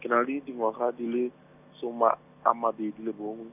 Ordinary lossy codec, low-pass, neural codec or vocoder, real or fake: none; 3.6 kHz; none; real